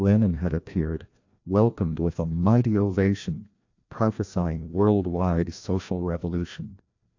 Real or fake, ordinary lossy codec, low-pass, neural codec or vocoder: fake; AAC, 48 kbps; 7.2 kHz; codec, 16 kHz, 1 kbps, FreqCodec, larger model